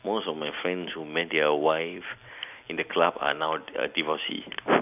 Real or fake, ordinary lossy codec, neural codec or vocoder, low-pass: real; none; none; 3.6 kHz